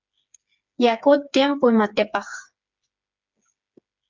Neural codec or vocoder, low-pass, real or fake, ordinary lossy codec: codec, 16 kHz, 4 kbps, FreqCodec, smaller model; 7.2 kHz; fake; MP3, 64 kbps